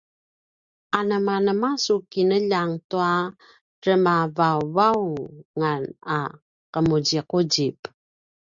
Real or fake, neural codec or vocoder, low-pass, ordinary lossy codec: real; none; 7.2 kHz; Opus, 64 kbps